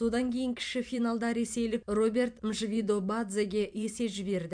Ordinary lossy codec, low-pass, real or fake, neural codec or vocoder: MP3, 64 kbps; 9.9 kHz; fake; vocoder, 44.1 kHz, 128 mel bands every 512 samples, BigVGAN v2